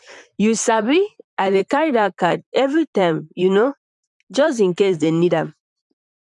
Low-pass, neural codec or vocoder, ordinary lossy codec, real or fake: 10.8 kHz; vocoder, 44.1 kHz, 128 mel bands, Pupu-Vocoder; none; fake